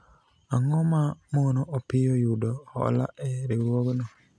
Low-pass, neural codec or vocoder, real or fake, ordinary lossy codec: none; none; real; none